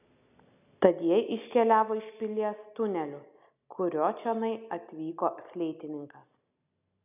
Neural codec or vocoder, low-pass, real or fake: none; 3.6 kHz; real